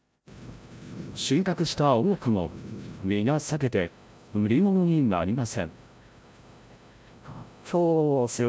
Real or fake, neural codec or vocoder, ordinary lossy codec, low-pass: fake; codec, 16 kHz, 0.5 kbps, FreqCodec, larger model; none; none